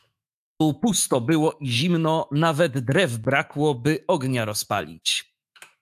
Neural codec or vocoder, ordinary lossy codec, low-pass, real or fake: autoencoder, 48 kHz, 128 numbers a frame, DAC-VAE, trained on Japanese speech; AAC, 96 kbps; 14.4 kHz; fake